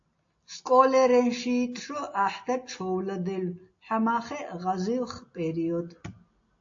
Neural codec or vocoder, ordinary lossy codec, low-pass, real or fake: none; AAC, 48 kbps; 7.2 kHz; real